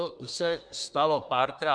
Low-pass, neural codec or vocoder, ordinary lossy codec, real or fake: 9.9 kHz; codec, 24 kHz, 1 kbps, SNAC; MP3, 96 kbps; fake